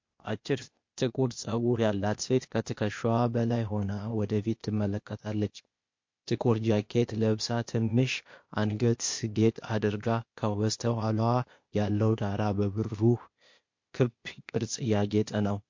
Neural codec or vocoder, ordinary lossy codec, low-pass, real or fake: codec, 16 kHz, 0.8 kbps, ZipCodec; MP3, 48 kbps; 7.2 kHz; fake